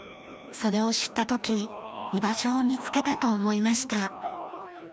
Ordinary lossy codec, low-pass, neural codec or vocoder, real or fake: none; none; codec, 16 kHz, 1 kbps, FreqCodec, larger model; fake